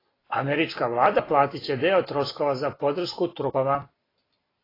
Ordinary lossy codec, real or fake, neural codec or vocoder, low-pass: AAC, 24 kbps; real; none; 5.4 kHz